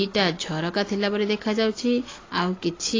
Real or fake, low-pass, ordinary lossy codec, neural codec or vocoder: real; 7.2 kHz; AAC, 32 kbps; none